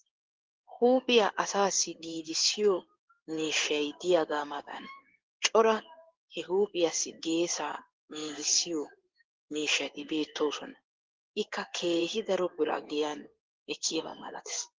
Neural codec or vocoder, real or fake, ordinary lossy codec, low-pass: codec, 16 kHz in and 24 kHz out, 1 kbps, XY-Tokenizer; fake; Opus, 16 kbps; 7.2 kHz